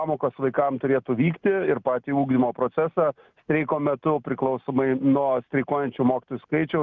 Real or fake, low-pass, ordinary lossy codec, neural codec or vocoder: real; 7.2 kHz; Opus, 24 kbps; none